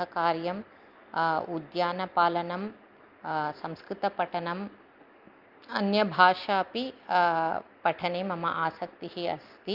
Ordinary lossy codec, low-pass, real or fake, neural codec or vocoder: Opus, 32 kbps; 5.4 kHz; real; none